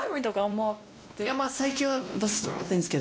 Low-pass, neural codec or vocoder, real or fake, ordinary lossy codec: none; codec, 16 kHz, 1 kbps, X-Codec, WavLM features, trained on Multilingual LibriSpeech; fake; none